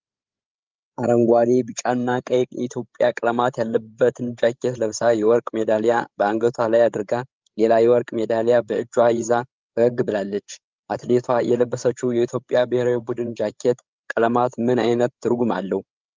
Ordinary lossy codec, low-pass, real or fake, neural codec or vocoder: Opus, 32 kbps; 7.2 kHz; fake; codec, 16 kHz, 8 kbps, FreqCodec, larger model